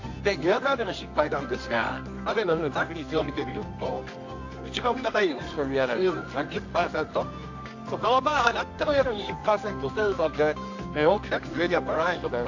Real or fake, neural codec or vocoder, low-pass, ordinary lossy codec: fake; codec, 24 kHz, 0.9 kbps, WavTokenizer, medium music audio release; 7.2 kHz; AAC, 48 kbps